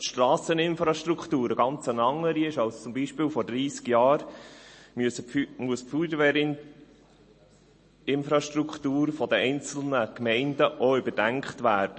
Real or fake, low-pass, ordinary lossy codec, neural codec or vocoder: real; 10.8 kHz; MP3, 32 kbps; none